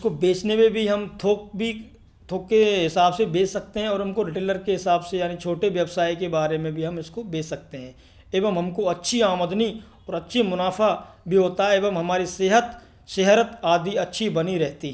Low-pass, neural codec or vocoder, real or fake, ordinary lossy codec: none; none; real; none